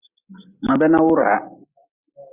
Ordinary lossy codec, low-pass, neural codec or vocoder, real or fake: Opus, 64 kbps; 3.6 kHz; none; real